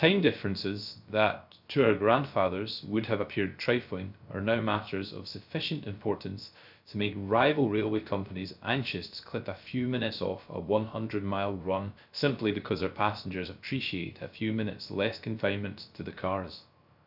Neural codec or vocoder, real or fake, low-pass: codec, 16 kHz, 0.3 kbps, FocalCodec; fake; 5.4 kHz